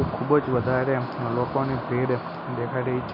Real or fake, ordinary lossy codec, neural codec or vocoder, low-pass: real; none; none; 5.4 kHz